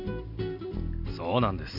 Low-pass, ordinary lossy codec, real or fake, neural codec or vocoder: 5.4 kHz; none; real; none